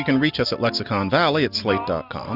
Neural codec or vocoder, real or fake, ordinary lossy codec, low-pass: none; real; Opus, 64 kbps; 5.4 kHz